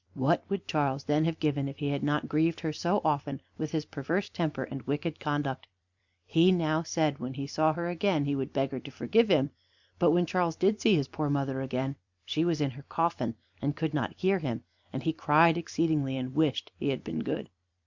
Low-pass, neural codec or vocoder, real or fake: 7.2 kHz; vocoder, 44.1 kHz, 128 mel bands every 256 samples, BigVGAN v2; fake